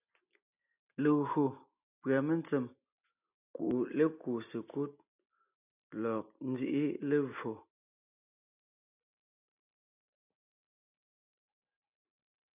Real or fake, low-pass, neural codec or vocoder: real; 3.6 kHz; none